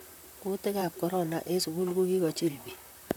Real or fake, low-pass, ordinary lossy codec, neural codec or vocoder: fake; none; none; vocoder, 44.1 kHz, 128 mel bands, Pupu-Vocoder